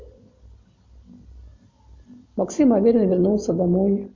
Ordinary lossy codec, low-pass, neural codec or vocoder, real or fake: MP3, 48 kbps; 7.2 kHz; none; real